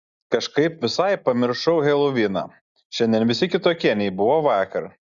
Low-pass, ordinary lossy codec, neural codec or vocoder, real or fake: 7.2 kHz; Opus, 64 kbps; none; real